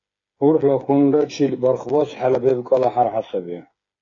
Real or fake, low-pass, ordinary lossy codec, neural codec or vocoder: fake; 7.2 kHz; AAC, 32 kbps; codec, 16 kHz, 8 kbps, FreqCodec, smaller model